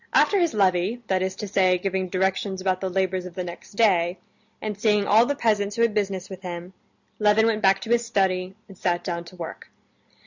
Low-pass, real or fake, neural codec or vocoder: 7.2 kHz; real; none